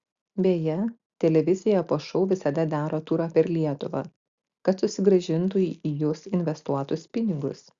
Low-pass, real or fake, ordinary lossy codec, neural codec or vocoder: 7.2 kHz; real; Opus, 64 kbps; none